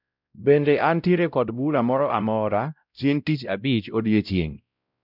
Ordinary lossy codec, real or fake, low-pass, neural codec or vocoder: none; fake; 5.4 kHz; codec, 16 kHz, 0.5 kbps, X-Codec, WavLM features, trained on Multilingual LibriSpeech